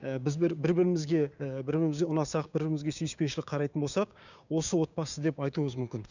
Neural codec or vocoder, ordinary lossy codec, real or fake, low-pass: codec, 16 kHz, 6 kbps, DAC; none; fake; 7.2 kHz